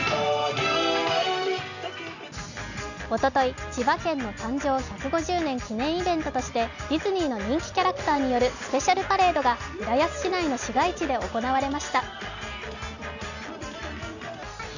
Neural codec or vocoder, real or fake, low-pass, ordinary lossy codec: none; real; 7.2 kHz; none